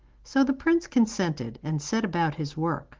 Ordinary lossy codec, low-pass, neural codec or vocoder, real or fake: Opus, 16 kbps; 7.2 kHz; none; real